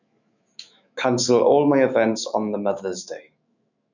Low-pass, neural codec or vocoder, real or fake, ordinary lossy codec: 7.2 kHz; autoencoder, 48 kHz, 128 numbers a frame, DAC-VAE, trained on Japanese speech; fake; none